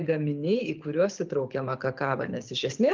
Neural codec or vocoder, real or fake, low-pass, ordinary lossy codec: codec, 24 kHz, 6 kbps, HILCodec; fake; 7.2 kHz; Opus, 32 kbps